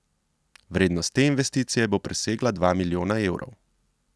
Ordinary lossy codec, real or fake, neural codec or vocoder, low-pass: none; real; none; none